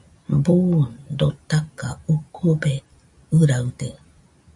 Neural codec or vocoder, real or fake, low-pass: none; real; 10.8 kHz